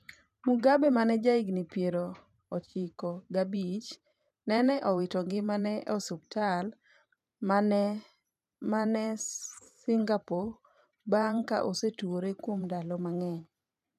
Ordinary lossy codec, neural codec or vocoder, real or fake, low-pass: none; vocoder, 44.1 kHz, 128 mel bands every 512 samples, BigVGAN v2; fake; 14.4 kHz